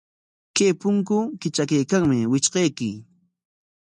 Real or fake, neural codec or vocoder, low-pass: real; none; 10.8 kHz